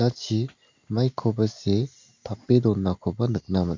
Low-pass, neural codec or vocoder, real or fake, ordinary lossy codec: 7.2 kHz; none; real; MP3, 64 kbps